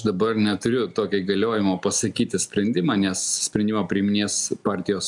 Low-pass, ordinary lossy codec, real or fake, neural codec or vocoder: 10.8 kHz; MP3, 96 kbps; real; none